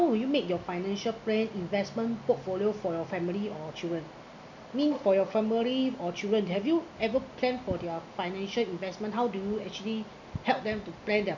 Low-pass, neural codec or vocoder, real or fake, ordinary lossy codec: 7.2 kHz; none; real; none